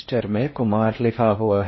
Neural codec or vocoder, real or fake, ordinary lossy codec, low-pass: codec, 16 kHz in and 24 kHz out, 0.6 kbps, FocalCodec, streaming, 4096 codes; fake; MP3, 24 kbps; 7.2 kHz